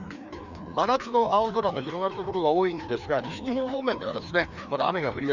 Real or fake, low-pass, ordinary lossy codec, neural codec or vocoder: fake; 7.2 kHz; none; codec, 16 kHz, 2 kbps, FreqCodec, larger model